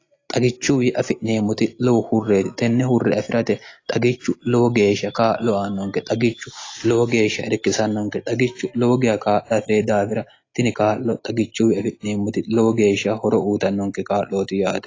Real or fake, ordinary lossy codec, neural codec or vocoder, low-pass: real; AAC, 32 kbps; none; 7.2 kHz